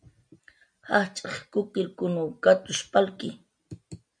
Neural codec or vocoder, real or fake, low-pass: none; real; 9.9 kHz